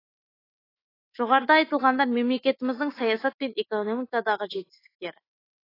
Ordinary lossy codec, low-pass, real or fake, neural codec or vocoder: AAC, 24 kbps; 5.4 kHz; fake; autoencoder, 48 kHz, 128 numbers a frame, DAC-VAE, trained on Japanese speech